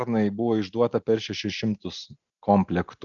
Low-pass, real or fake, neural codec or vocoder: 7.2 kHz; real; none